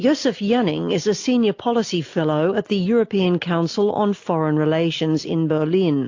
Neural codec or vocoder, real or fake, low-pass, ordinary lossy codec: none; real; 7.2 kHz; AAC, 48 kbps